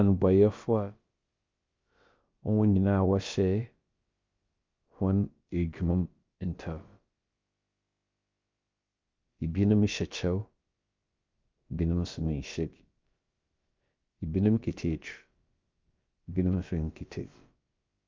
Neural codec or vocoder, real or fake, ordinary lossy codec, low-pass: codec, 16 kHz, about 1 kbps, DyCAST, with the encoder's durations; fake; Opus, 24 kbps; 7.2 kHz